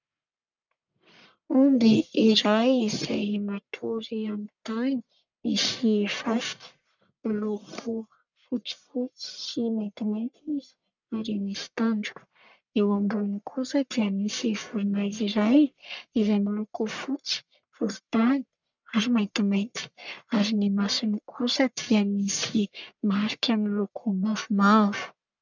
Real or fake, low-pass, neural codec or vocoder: fake; 7.2 kHz; codec, 44.1 kHz, 1.7 kbps, Pupu-Codec